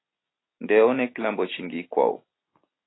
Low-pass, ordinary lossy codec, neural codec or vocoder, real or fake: 7.2 kHz; AAC, 16 kbps; none; real